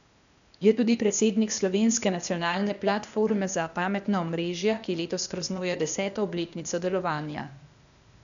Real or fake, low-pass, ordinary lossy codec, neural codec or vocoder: fake; 7.2 kHz; none; codec, 16 kHz, 0.8 kbps, ZipCodec